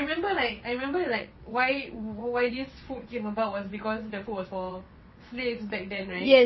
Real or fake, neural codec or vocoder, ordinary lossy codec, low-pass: fake; vocoder, 22.05 kHz, 80 mel bands, WaveNeXt; MP3, 24 kbps; 7.2 kHz